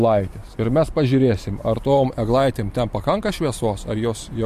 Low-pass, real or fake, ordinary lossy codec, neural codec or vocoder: 14.4 kHz; fake; MP3, 64 kbps; vocoder, 44.1 kHz, 128 mel bands every 512 samples, BigVGAN v2